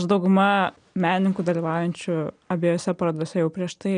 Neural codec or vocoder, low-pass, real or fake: none; 9.9 kHz; real